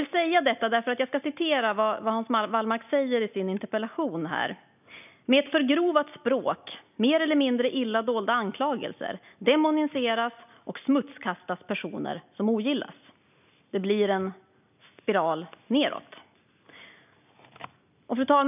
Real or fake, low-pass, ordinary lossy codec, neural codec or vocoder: real; 3.6 kHz; none; none